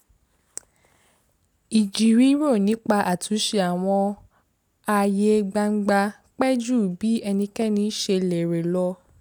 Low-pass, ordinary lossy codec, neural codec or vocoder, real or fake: none; none; none; real